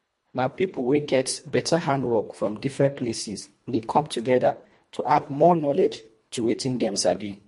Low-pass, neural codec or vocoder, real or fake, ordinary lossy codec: 10.8 kHz; codec, 24 kHz, 1.5 kbps, HILCodec; fake; MP3, 48 kbps